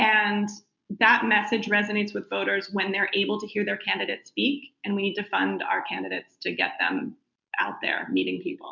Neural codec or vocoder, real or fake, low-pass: none; real; 7.2 kHz